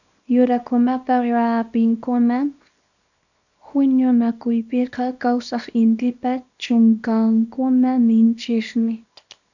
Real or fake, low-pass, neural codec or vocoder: fake; 7.2 kHz; codec, 24 kHz, 0.9 kbps, WavTokenizer, small release